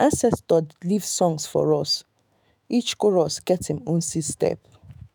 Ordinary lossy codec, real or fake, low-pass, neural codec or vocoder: none; fake; none; autoencoder, 48 kHz, 128 numbers a frame, DAC-VAE, trained on Japanese speech